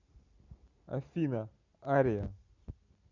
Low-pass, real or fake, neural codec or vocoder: 7.2 kHz; real; none